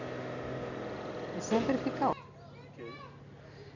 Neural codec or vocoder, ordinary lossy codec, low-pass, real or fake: none; none; 7.2 kHz; real